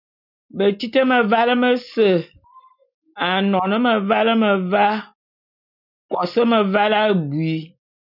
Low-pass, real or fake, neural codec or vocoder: 5.4 kHz; real; none